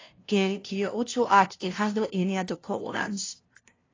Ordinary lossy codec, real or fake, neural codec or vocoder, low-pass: AAC, 32 kbps; fake; codec, 16 kHz, 0.5 kbps, FunCodec, trained on LibriTTS, 25 frames a second; 7.2 kHz